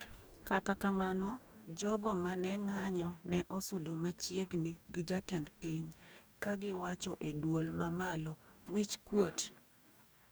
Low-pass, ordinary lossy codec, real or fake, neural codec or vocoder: none; none; fake; codec, 44.1 kHz, 2.6 kbps, DAC